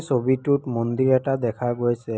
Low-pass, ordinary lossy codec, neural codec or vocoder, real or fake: none; none; none; real